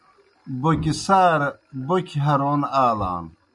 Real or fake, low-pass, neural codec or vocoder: real; 10.8 kHz; none